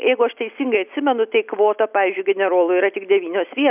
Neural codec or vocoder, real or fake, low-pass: none; real; 3.6 kHz